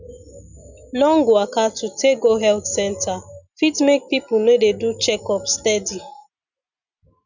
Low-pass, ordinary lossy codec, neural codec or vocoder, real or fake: 7.2 kHz; none; none; real